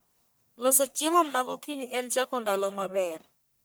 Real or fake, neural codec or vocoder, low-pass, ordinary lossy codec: fake; codec, 44.1 kHz, 1.7 kbps, Pupu-Codec; none; none